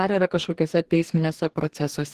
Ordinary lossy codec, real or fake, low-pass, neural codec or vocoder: Opus, 16 kbps; fake; 14.4 kHz; codec, 44.1 kHz, 2.6 kbps, DAC